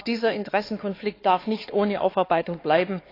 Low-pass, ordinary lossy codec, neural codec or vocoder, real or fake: 5.4 kHz; AAC, 24 kbps; codec, 16 kHz, 4 kbps, X-Codec, HuBERT features, trained on LibriSpeech; fake